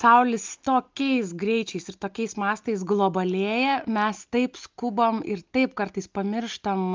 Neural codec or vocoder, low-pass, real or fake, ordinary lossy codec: none; 7.2 kHz; real; Opus, 24 kbps